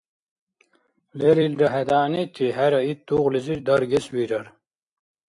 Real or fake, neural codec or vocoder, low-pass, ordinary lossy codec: fake; vocoder, 44.1 kHz, 128 mel bands every 256 samples, BigVGAN v2; 10.8 kHz; AAC, 64 kbps